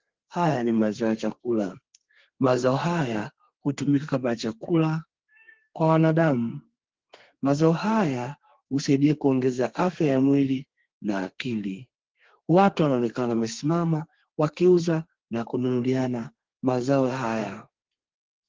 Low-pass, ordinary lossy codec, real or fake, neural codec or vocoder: 7.2 kHz; Opus, 16 kbps; fake; codec, 32 kHz, 1.9 kbps, SNAC